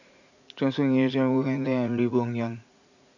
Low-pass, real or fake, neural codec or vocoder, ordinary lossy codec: 7.2 kHz; fake; vocoder, 44.1 kHz, 80 mel bands, Vocos; none